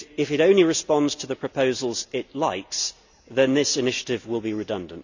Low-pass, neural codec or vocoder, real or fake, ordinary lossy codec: 7.2 kHz; none; real; none